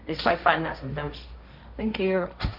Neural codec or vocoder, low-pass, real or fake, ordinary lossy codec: codec, 16 kHz, 1.1 kbps, Voila-Tokenizer; 5.4 kHz; fake; none